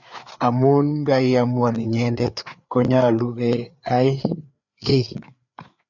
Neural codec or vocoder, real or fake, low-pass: codec, 16 kHz, 4 kbps, FreqCodec, larger model; fake; 7.2 kHz